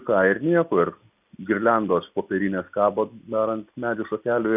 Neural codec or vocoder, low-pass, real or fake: none; 3.6 kHz; real